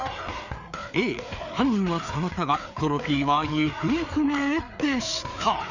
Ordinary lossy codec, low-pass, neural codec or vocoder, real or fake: none; 7.2 kHz; codec, 16 kHz, 4 kbps, FreqCodec, larger model; fake